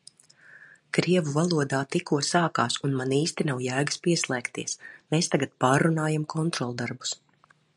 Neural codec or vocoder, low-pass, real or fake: none; 10.8 kHz; real